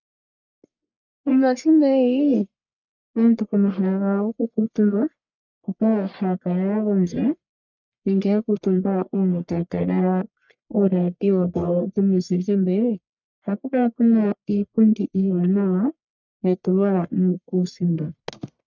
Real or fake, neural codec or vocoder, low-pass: fake; codec, 44.1 kHz, 1.7 kbps, Pupu-Codec; 7.2 kHz